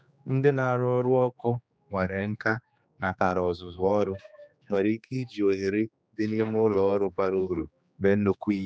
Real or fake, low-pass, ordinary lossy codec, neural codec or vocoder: fake; none; none; codec, 16 kHz, 2 kbps, X-Codec, HuBERT features, trained on general audio